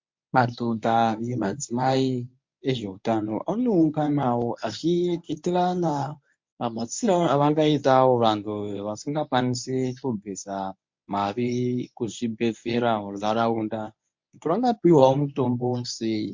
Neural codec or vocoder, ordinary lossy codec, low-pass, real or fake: codec, 24 kHz, 0.9 kbps, WavTokenizer, medium speech release version 1; MP3, 48 kbps; 7.2 kHz; fake